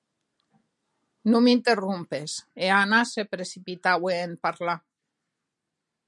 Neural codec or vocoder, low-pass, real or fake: none; 10.8 kHz; real